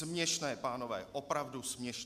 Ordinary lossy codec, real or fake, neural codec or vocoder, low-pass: MP3, 96 kbps; real; none; 14.4 kHz